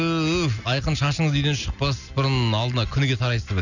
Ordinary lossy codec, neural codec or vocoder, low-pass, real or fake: none; none; 7.2 kHz; real